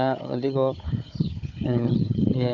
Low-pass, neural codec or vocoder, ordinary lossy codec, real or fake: 7.2 kHz; codec, 16 kHz, 4 kbps, FunCodec, trained on Chinese and English, 50 frames a second; none; fake